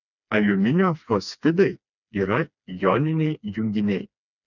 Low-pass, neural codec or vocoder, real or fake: 7.2 kHz; codec, 16 kHz, 2 kbps, FreqCodec, smaller model; fake